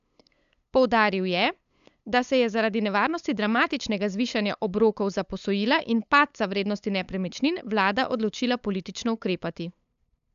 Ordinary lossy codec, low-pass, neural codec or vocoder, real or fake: AAC, 96 kbps; 7.2 kHz; codec, 16 kHz, 8 kbps, FunCodec, trained on LibriTTS, 25 frames a second; fake